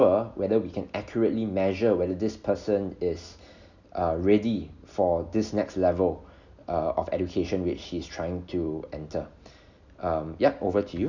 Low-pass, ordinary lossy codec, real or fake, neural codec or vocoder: 7.2 kHz; none; real; none